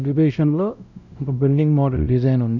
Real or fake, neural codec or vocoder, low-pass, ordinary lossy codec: fake; codec, 16 kHz, 0.5 kbps, X-Codec, WavLM features, trained on Multilingual LibriSpeech; 7.2 kHz; none